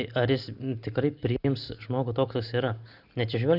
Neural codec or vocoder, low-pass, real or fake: none; 5.4 kHz; real